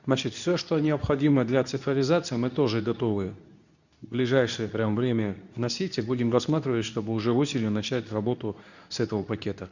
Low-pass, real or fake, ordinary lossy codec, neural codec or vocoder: 7.2 kHz; fake; none; codec, 24 kHz, 0.9 kbps, WavTokenizer, medium speech release version 2